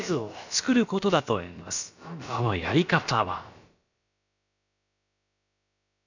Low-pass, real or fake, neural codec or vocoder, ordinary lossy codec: 7.2 kHz; fake; codec, 16 kHz, about 1 kbps, DyCAST, with the encoder's durations; none